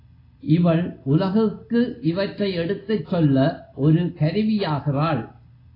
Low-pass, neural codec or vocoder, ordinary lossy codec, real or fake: 5.4 kHz; none; AAC, 24 kbps; real